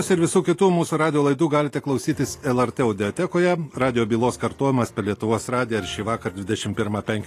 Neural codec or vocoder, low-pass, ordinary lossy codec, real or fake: none; 14.4 kHz; AAC, 48 kbps; real